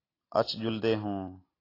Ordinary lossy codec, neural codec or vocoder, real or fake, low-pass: AAC, 24 kbps; none; real; 5.4 kHz